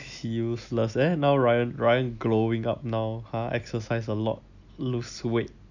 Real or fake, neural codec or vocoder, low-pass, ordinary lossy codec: real; none; 7.2 kHz; none